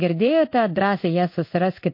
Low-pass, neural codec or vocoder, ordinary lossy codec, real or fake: 5.4 kHz; codec, 16 kHz in and 24 kHz out, 1 kbps, XY-Tokenizer; MP3, 32 kbps; fake